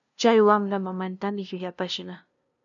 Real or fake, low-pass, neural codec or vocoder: fake; 7.2 kHz; codec, 16 kHz, 0.5 kbps, FunCodec, trained on LibriTTS, 25 frames a second